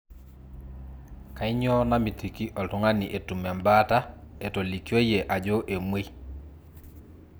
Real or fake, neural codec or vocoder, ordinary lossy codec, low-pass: real; none; none; none